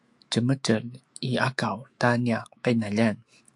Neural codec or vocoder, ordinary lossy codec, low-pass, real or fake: autoencoder, 48 kHz, 128 numbers a frame, DAC-VAE, trained on Japanese speech; AAC, 64 kbps; 10.8 kHz; fake